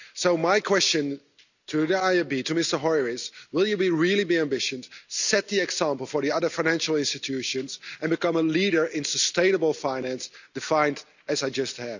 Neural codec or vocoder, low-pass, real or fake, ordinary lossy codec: none; 7.2 kHz; real; none